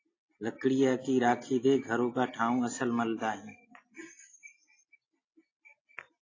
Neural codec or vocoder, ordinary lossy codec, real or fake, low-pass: none; AAC, 32 kbps; real; 7.2 kHz